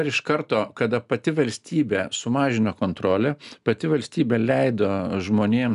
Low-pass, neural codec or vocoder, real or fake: 10.8 kHz; none; real